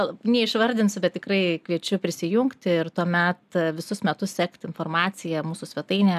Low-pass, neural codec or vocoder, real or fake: 14.4 kHz; none; real